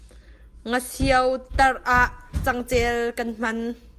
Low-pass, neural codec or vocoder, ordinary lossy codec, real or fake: 14.4 kHz; none; Opus, 32 kbps; real